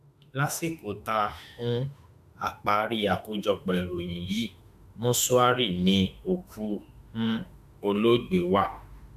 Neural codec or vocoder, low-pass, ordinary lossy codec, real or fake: autoencoder, 48 kHz, 32 numbers a frame, DAC-VAE, trained on Japanese speech; 14.4 kHz; none; fake